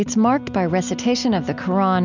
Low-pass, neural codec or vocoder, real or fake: 7.2 kHz; none; real